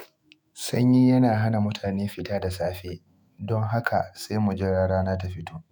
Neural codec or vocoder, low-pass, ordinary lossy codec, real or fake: autoencoder, 48 kHz, 128 numbers a frame, DAC-VAE, trained on Japanese speech; none; none; fake